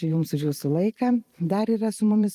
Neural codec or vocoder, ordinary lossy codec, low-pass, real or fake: vocoder, 44.1 kHz, 128 mel bands every 512 samples, BigVGAN v2; Opus, 32 kbps; 14.4 kHz; fake